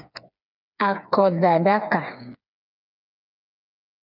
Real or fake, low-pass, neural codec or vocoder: fake; 5.4 kHz; codec, 16 kHz, 4 kbps, FreqCodec, smaller model